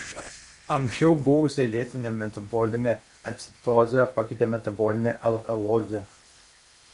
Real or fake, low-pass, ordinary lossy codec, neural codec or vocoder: fake; 10.8 kHz; MP3, 64 kbps; codec, 16 kHz in and 24 kHz out, 0.8 kbps, FocalCodec, streaming, 65536 codes